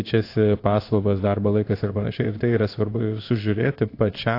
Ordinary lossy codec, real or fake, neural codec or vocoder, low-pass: AAC, 32 kbps; fake; codec, 16 kHz in and 24 kHz out, 1 kbps, XY-Tokenizer; 5.4 kHz